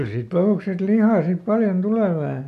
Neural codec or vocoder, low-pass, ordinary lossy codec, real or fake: none; 14.4 kHz; none; real